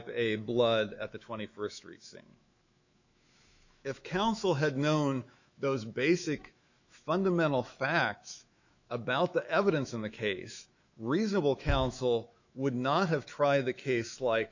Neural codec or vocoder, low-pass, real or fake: autoencoder, 48 kHz, 128 numbers a frame, DAC-VAE, trained on Japanese speech; 7.2 kHz; fake